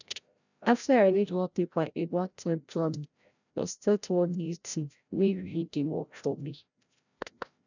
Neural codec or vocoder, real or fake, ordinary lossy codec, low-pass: codec, 16 kHz, 0.5 kbps, FreqCodec, larger model; fake; none; 7.2 kHz